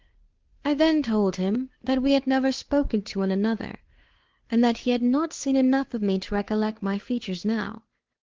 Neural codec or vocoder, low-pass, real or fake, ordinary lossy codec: codec, 16 kHz, 2 kbps, FunCodec, trained on Chinese and English, 25 frames a second; 7.2 kHz; fake; Opus, 16 kbps